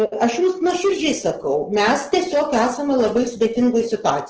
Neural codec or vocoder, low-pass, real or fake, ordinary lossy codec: none; 7.2 kHz; real; Opus, 16 kbps